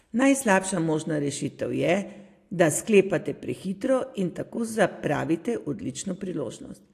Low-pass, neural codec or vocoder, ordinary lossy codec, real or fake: 14.4 kHz; none; AAC, 64 kbps; real